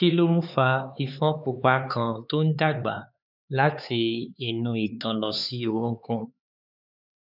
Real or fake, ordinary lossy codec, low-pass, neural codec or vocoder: fake; none; 5.4 kHz; codec, 16 kHz, 4 kbps, X-Codec, HuBERT features, trained on LibriSpeech